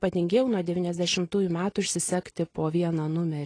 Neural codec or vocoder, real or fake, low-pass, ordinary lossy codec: none; real; 9.9 kHz; AAC, 32 kbps